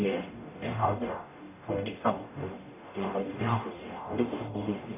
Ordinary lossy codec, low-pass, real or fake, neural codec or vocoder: none; 3.6 kHz; fake; codec, 44.1 kHz, 0.9 kbps, DAC